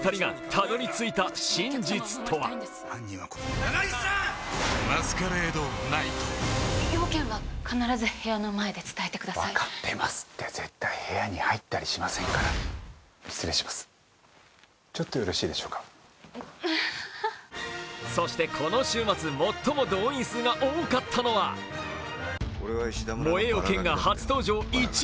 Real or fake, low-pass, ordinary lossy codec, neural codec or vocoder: real; none; none; none